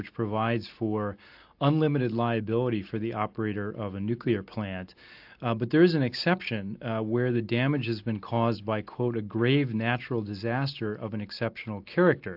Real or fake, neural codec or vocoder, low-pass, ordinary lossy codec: real; none; 5.4 kHz; AAC, 48 kbps